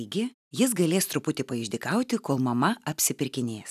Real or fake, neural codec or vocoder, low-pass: real; none; 14.4 kHz